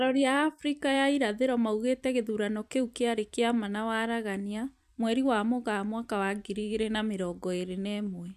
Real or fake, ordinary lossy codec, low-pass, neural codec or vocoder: fake; MP3, 96 kbps; 14.4 kHz; vocoder, 44.1 kHz, 128 mel bands every 256 samples, BigVGAN v2